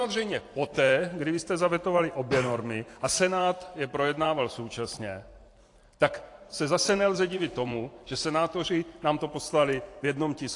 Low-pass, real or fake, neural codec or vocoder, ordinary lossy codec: 10.8 kHz; fake; vocoder, 44.1 kHz, 128 mel bands every 512 samples, BigVGAN v2; AAC, 48 kbps